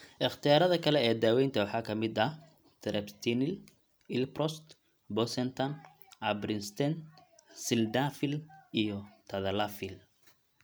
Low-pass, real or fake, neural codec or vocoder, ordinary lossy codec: none; real; none; none